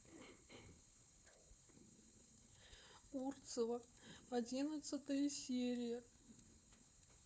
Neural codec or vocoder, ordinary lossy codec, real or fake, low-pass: codec, 16 kHz, 4 kbps, FunCodec, trained on Chinese and English, 50 frames a second; none; fake; none